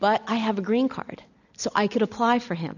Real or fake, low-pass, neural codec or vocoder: real; 7.2 kHz; none